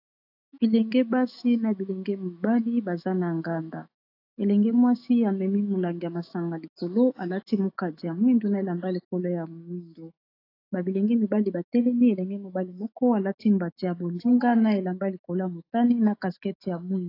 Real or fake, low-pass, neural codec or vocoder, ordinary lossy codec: fake; 5.4 kHz; autoencoder, 48 kHz, 128 numbers a frame, DAC-VAE, trained on Japanese speech; AAC, 32 kbps